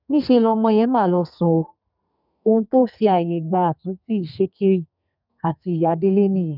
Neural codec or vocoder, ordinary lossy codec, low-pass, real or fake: codec, 32 kHz, 1.9 kbps, SNAC; none; 5.4 kHz; fake